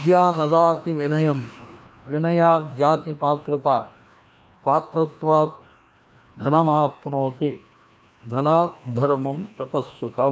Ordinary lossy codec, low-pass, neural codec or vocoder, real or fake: none; none; codec, 16 kHz, 1 kbps, FreqCodec, larger model; fake